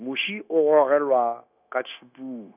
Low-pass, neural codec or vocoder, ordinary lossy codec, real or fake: 3.6 kHz; codec, 16 kHz, 0.9 kbps, LongCat-Audio-Codec; none; fake